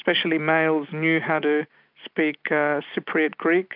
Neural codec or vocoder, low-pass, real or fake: none; 5.4 kHz; real